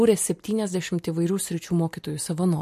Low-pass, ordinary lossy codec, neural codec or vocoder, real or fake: 14.4 kHz; MP3, 64 kbps; none; real